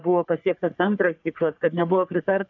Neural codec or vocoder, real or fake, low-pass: codec, 44.1 kHz, 3.4 kbps, Pupu-Codec; fake; 7.2 kHz